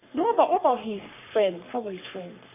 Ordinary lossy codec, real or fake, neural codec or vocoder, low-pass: AAC, 32 kbps; fake; codec, 44.1 kHz, 3.4 kbps, Pupu-Codec; 3.6 kHz